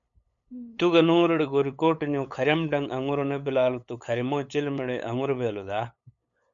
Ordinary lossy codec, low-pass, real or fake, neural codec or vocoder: MP3, 64 kbps; 7.2 kHz; fake; codec, 16 kHz, 8 kbps, FunCodec, trained on LibriTTS, 25 frames a second